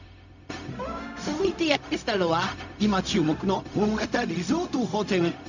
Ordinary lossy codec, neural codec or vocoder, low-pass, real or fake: none; codec, 16 kHz, 0.4 kbps, LongCat-Audio-Codec; 7.2 kHz; fake